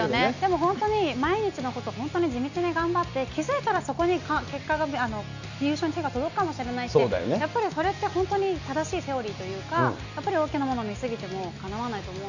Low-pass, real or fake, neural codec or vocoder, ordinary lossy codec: 7.2 kHz; real; none; none